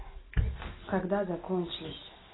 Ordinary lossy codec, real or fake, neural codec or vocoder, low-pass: AAC, 16 kbps; real; none; 7.2 kHz